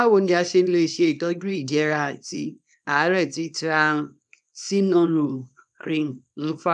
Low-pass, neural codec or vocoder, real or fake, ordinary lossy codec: 10.8 kHz; codec, 24 kHz, 0.9 kbps, WavTokenizer, small release; fake; none